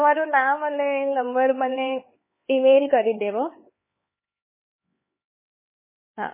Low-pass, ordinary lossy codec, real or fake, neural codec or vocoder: 3.6 kHz; MP3, 16 kbps; fake; codec, 16 kHz, 4 kbps, X-Codec, HuBERT features, trained on LibriSpeech